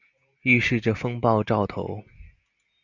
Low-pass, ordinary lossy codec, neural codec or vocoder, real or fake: 7.2 kHz; Opus, 64 kbps; none; real